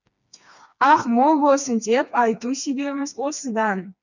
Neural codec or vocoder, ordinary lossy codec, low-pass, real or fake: codec, 16 kHz, 2 kbps, FreqCodec, smaller model; none; 7.2 kHz; fake